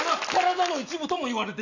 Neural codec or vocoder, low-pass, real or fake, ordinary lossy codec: none; 7.2 kHz; real; none